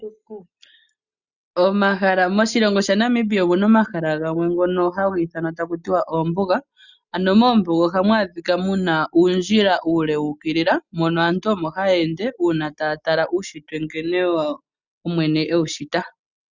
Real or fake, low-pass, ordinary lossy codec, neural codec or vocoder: real; 7.2 kHz; Opus, 64 kbps; none